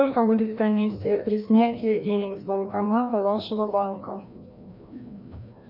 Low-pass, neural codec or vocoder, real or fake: 5.4 kHz; codec, 16 kHz, 1 kbps, FreqCodec, larger model; fake